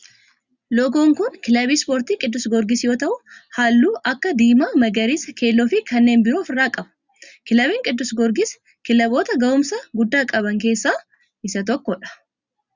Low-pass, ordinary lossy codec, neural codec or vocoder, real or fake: 7.2 kHz; Opus, 64 kbps; none; real